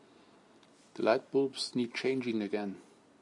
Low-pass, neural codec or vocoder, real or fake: 10.8 kHz; none; real